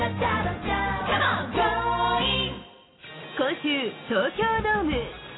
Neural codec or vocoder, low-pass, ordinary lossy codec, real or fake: none; 7.2 kHz; AAC, 16 kbps; real